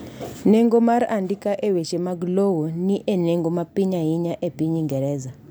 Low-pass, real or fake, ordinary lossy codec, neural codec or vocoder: none; real; none; none